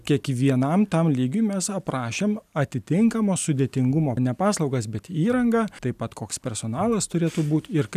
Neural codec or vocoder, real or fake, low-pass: none; real; 14.4 kHz